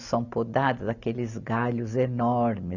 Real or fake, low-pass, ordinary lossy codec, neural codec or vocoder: real; 7.2 kHz; none; none